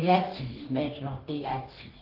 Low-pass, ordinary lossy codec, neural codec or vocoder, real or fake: 5.4 kHz; Opus, 16 kbps; codec, 44.1 kHz, 2.6 kbps, DAC; fake